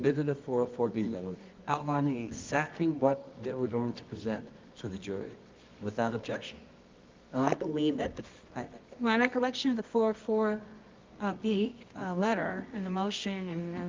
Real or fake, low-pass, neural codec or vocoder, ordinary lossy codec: fake; 7.2 kHz; codec, 24 kHz, 0.9 kbps, WavTokenizer, medium music audio release; Opus, 24 kbps